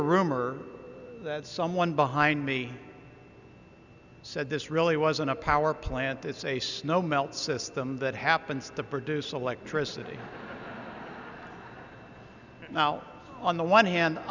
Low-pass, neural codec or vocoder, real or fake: 7.2 kHz; none; real